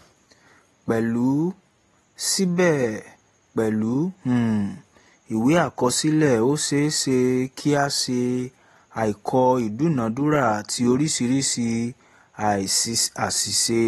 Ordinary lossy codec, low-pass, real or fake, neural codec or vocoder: AAC, 32 kbps; 14.4 kHz; real; none